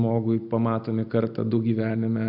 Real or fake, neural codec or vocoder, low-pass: real; none; 5.4 kHz